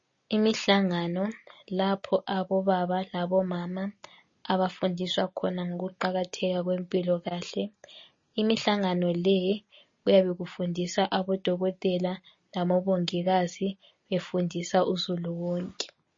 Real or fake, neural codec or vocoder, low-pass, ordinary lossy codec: real; none; 7.2 kHz; MP3, 32 kbps